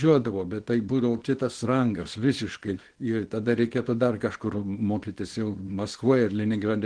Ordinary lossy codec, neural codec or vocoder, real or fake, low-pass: Opus, 16 kbps; codec, 24 kHz, 0.9 kbps, WavTokenizer, small release; fake; 9.9 kHz